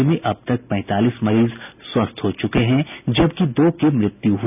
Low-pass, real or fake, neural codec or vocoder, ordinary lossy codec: 3.6 kHz; real; none; none